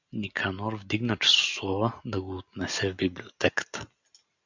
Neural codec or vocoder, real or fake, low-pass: none; real; 7.2 kHz